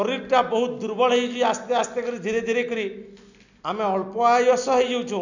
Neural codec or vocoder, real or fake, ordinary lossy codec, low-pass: none; real; none; 7.2 kHz